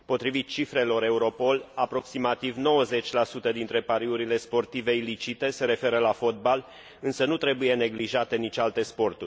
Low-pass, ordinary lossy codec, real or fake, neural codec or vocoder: none; none; real; none